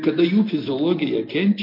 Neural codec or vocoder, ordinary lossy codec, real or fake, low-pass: none; MP3, 32 kbps; real; 5.4 kHz